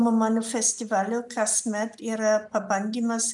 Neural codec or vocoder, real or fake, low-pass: none; real; 10.8 kHz